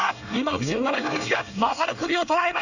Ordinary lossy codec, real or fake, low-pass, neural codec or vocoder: none; fake; 7.2 kHz; codec, 24 kHz, 1 kbps, SNAC